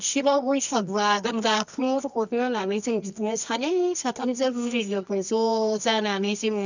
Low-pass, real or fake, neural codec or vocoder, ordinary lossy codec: 7.2 kHz; fake; codec, 24 kHz, 0.9 kbps, WavTokenizer, medium music audio release; none